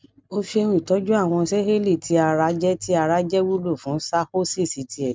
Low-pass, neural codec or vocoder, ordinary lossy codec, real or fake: none; none; none; real